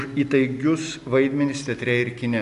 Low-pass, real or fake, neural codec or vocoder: 10.8 kHz; real; none